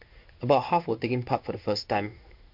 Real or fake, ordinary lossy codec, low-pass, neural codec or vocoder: fake; MP3, 48 kbps; 5.4 kHz; codec, 16 kHz in and 24 kHz out, 1 kbps, XY-Tokenizer